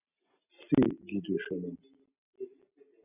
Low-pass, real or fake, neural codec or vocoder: 3.6 kHz; real; none